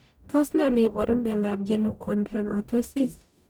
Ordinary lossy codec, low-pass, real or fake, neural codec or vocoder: none; none; fake; codec, 44.1 kHz, 0.9 kbps, DAC